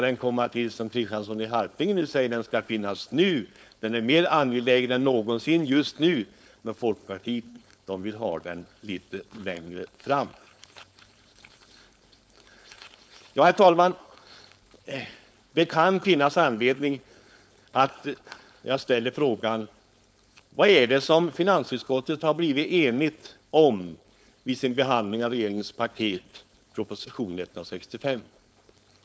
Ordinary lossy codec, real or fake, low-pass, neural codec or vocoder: none; fake; none; codec, 16 kHz, 4.8 kbps, FACodec